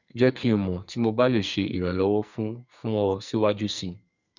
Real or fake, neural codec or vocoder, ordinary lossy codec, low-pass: fake; codec, 44.1 kHz, 2.6 kbps, SNAC; none; 7.2 kHz